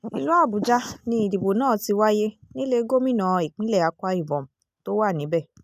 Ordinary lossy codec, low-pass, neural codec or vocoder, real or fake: none; 14.4 kHz; none; real